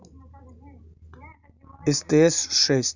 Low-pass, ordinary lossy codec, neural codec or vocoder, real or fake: 7.2 kHz; none; none; real